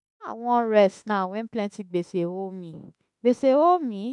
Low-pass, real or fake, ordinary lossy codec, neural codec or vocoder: 10.8 kHz; fake; none; autoencoder, 48 kHz, 32 numbers a frame, DAC-VAE, trained on Japanese speech